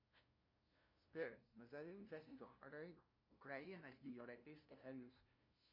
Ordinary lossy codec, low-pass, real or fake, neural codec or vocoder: MP3, 32 kbps; 5.4 kHz; fake; codec, 16 kHz, 0.5 kbps, FunCodec, trained on LibriTTS, 25 frames a second